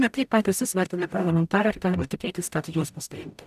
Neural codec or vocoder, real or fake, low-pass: codec, 44.1 kHz, 0.9 kbps, DAC; fake; 14.4 kHz